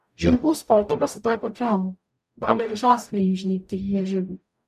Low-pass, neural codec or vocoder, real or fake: 14.4 kHz; codec, 44.1 kHz, 0.9 kbps, DAC; fake